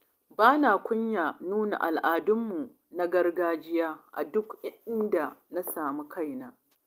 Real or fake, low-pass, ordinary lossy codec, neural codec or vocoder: real; 14.4 kHz; Opus, 32 kbps; none